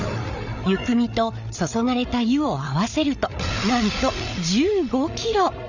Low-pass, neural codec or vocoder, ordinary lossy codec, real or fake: 7.2 kHz; codec, 16 kHz, 8 kbps, FreqCodec, larger model; none; fake